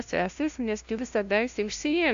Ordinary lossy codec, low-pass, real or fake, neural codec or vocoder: AAC, 64 kbps; 7.2 kHz; fake; codec, 16 kHz, 0.5 kbps, FunCodec, trained on LibriTTS, 25 frames a second